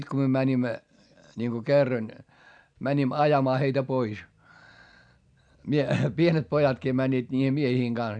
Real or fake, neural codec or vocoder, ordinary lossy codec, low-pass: real; none; none; 9.9 kHz